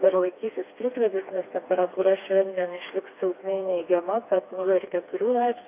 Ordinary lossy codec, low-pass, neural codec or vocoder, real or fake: AAC, 24 kbps; 3.6 kHz; codec, 16 kHz, 2 kbps, FreqCodec, smaller model; fake